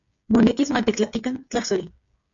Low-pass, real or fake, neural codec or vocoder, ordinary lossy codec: 7.2 kHz; fake; codec, 16 kHz, 8 kbps, FreqCodec, smaller model; MP3, 48 kbps